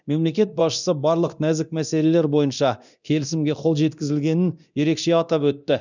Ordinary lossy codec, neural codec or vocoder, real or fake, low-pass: none; codec, 24 kHz, 0.9 kbps, DualCodec; fake; 7.2 kHz